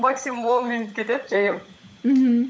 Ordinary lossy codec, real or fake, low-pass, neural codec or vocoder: none; fake; none; codec, 16 kHz, 16 kbps, FunCodec, trained on LibriTTS, 50 frames a second